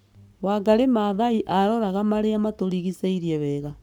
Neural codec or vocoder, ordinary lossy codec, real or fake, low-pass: codec, 44.1 kHz, 7.8 kbps, Pupu-Codec; none; fake; none